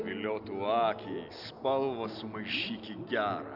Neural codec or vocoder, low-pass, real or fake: none; 5.4 kHz; real